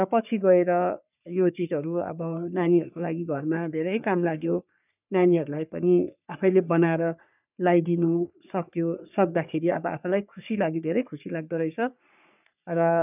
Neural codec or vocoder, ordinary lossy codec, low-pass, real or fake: codec, 44.1 kHz, 3.4 kbps, Pupu-Codec; none; 3.6 kHz; fake